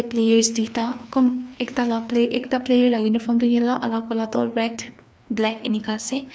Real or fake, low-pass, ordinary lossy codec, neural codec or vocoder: fake; none; none; codec, 16 kHz, 2 kbps, FreqCodec, larger model